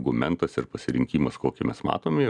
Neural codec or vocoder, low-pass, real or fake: none; 10.8 kHz; real